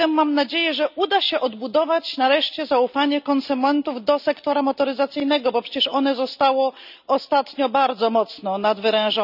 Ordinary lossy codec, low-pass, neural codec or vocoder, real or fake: none; 5.4 kHz; none; real